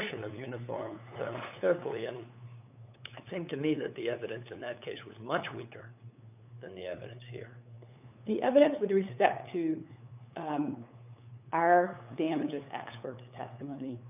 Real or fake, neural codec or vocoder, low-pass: fake; codec, 16 kHz, 8 kbps, FunCodec, trained on LibriTTS, 25 frames a second; 3.6 kHz